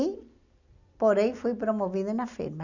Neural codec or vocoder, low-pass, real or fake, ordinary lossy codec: none; 7.2 kHz; real; none